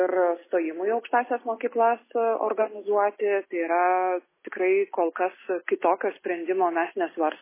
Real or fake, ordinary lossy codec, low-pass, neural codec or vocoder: real; MP3, 16 kbps; 3.6 kHz; none